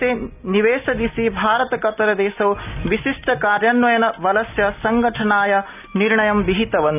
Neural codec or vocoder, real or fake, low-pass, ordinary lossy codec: none; real; 3.6 kHz; none